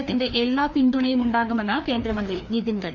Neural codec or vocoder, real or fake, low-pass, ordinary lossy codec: codec, 16 kHz, 2 kbps, FreqCodec, larger model; fake; 7.2 kHz; none